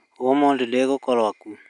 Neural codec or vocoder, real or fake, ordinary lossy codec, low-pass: none; real; none; 10.8 kHz